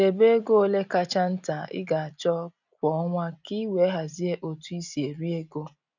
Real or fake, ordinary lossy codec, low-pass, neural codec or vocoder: real; none; 7.2 kHz; none